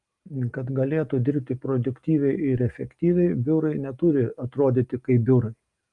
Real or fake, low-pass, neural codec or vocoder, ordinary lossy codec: real; 10.8 kHz; none; Opus, 32 kbps